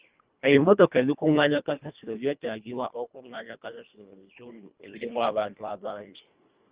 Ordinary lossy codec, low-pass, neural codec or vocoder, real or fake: Opus, 24 kbps; 3.6 kHz; codec, 24 kHz, 1.5 kbps, HILCodec; fake